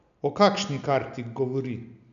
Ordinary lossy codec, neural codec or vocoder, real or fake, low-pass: none; none; real; 7.2 kHz